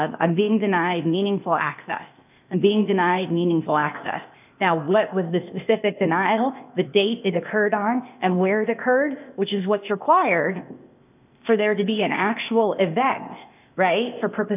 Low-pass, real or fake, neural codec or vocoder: 3.6 kHz; fake; codec, 16 kHz, 0.8 kbps, ZipCodec